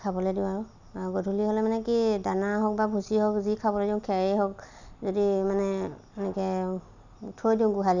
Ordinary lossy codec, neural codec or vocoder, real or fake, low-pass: none; none; real; 7.2 kHz